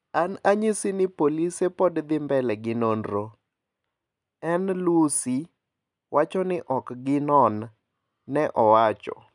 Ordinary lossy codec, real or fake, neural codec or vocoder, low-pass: none; real; none; 10.8 kHz